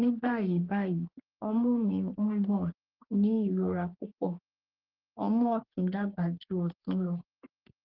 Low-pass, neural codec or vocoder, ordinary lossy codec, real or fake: 5.4 kHz; codec, 44.1 kHz, 3.4 kbps, Pupu-Codec; Opus, 16 kbps; fake